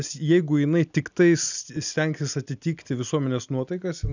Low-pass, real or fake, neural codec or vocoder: 7.2 kHz; real; none